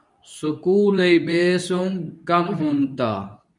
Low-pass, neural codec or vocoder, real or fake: 10.8 kHz; codec, 24 kHz, 0.9 kbps, WavTokenizer, medium speech release version 2; fake